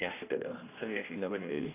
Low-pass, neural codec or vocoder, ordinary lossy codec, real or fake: 3.6 kHz; codec, 16 kHz, 1 kbps, X-Codec, HuBERT features, trained on general audio; none; fake